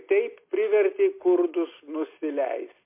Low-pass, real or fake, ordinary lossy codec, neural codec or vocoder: 3.6 kHz; real; MP3, 24 kbps; none